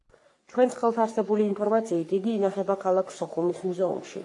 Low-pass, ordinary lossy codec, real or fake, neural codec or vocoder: 10.8 kHz; AAC, 48 kbps; fake; codec, 44.1 kHz, 3.4 kbps, Pupu-Codec